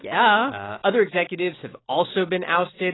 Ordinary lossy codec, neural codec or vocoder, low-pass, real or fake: AAC, 16 kbps; none; 7.2 kHz; real